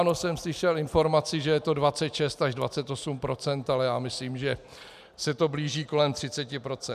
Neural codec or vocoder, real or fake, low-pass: none; real; 14.4 kHz